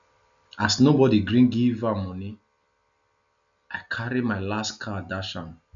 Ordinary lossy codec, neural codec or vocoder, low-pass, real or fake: none; none; 7.2 kHz; real